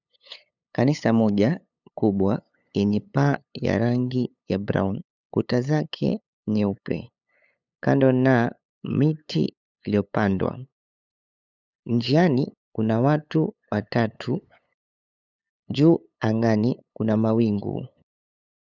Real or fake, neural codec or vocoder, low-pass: fake; codec, 16 kHz, 8 kbps, FunCodec, trained on LibriTTS, 25 frames a second; 7.2 kHz